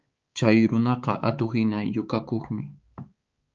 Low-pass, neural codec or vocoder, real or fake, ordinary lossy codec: 7.2 kHz; codec, 16 kHz, 4 kbps, X-Codec, HuBERT features, trained on balanced general audio; fake; Opus, 24 kbps